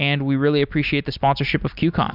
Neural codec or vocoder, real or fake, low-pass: none; real; 5.4 kHz